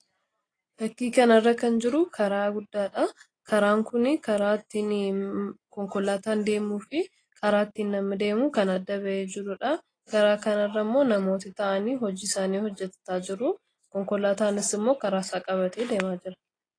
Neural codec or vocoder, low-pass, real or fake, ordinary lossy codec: none; 9.9 kHz; real; AAC, 32 kbps